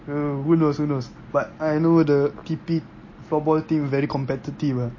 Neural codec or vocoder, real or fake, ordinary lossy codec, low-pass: none; real; MP3, 32 kbps; 7.2 kHz